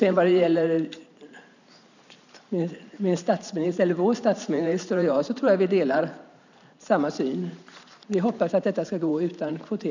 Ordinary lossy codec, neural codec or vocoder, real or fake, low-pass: none; vocoder, 44.1 kHz, 128 mel bands every 512 samples, BigVGAN v2; fake; 7.2 kHz